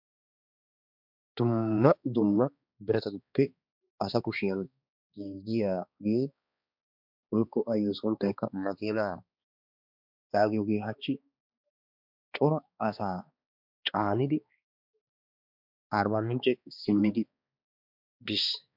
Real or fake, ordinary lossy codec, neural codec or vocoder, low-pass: fake; MP3, 48 kbps; codec, 16 kHz, 2 kbps, X-Codec, HuBERT features, trained on balanced general audio; 5.4 kHz